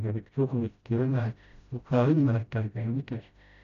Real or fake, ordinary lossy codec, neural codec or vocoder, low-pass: fake; none; codec, 16 kHz, 0.5 kbps, FreqCodec, smaller model; 7.2 kHz